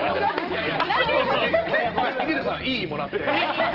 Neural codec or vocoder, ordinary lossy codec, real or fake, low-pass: none; Opus, 16 kbps; real; 5.4 kHz